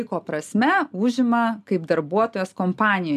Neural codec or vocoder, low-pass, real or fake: none; 14.4 kHz; real